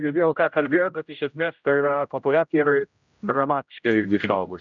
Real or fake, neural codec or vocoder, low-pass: fake; codec, 16 kHz, 0.5 kbps, X-Codec, HuBERT features, trained on general audio; 7.2 kHz